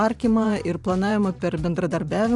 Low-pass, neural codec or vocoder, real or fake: 10.8 kHz; vocoder, 44.1 kHz, 128 mel bands every 512 samples, BigVGAN v2; fake